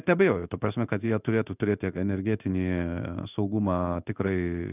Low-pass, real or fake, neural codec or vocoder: 3.6 kHz; fake; codec, 16 kHz in and 24 kHz out, 1 kbps, XY-Tokenizer